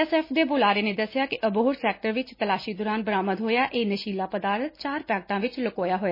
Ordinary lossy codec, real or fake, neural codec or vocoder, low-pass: MP3, 24 kbps; real; none; 5.4 kHz